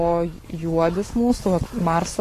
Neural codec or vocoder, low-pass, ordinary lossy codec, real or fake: codec, 44.1 kHz, 7.8 kbps, Pupu-Codec; 14.4 kHz; AAC, 48 kbps; fake